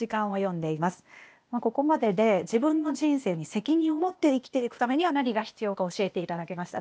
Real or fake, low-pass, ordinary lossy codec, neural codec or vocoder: fake; none; none; codec, 16 kHz, 0.8 kbps, ZipCodec